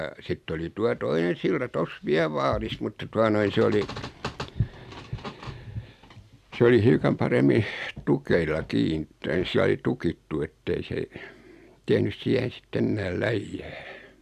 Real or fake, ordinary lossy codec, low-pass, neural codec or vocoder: real; AAC, 96 kbps; 14.4 kHz; none